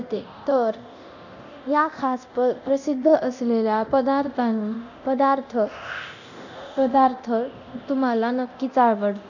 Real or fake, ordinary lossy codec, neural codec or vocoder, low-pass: fake; none; codec, 24 kHz, 0.9 kbps, DualCodec; 7.2 kHz